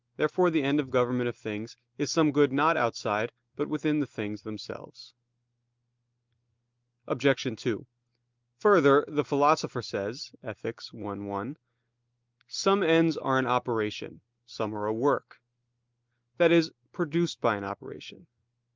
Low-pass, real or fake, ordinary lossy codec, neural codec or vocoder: 7.2 kHz; real; Opus, 24 kbps; none